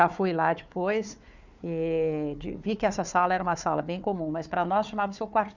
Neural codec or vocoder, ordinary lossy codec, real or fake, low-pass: codec, 16 kHz, 4 kbps, FunCodec, trained on Chinese and English, 50 frames a second; none; fake; 7.2 kHz